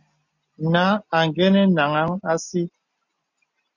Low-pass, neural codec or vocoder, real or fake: 7.2 kHz; none; real